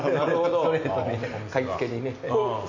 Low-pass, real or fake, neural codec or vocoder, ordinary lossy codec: 7.2 kHz; real; none; MP3, 64 kbps